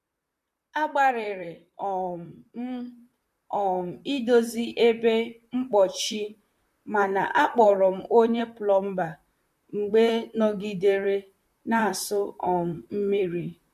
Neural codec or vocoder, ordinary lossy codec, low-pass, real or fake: vocoder, 44.1 kHz, 128 mel bands, Pupu-Vocoder; MP3, 64 kbps; 14.4 kHz; fake